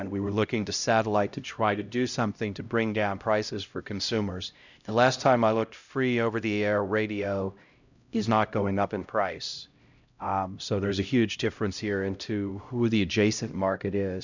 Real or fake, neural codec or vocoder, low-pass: fake; codec, 16 kHz, 0.5 kbps, X-Codec, HuBERT features, trained on LibriSpeech; 7.2 kHz